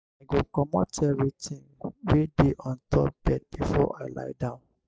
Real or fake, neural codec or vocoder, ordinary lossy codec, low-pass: real; none; none; none